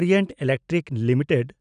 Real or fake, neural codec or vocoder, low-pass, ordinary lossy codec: real; none; 9.9 kHz; none